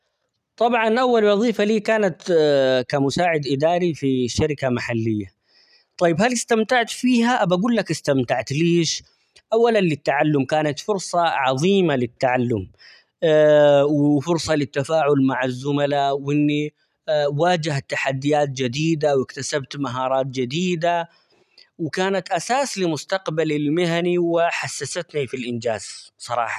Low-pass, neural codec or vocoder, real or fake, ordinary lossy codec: 14.4 kHz; none; real; none